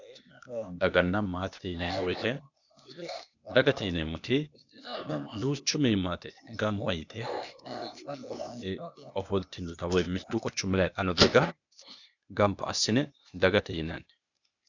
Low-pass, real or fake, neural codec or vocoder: 7.2 kHz; fake; codec, 16 kHz, 0.8 kbps, ZipCodec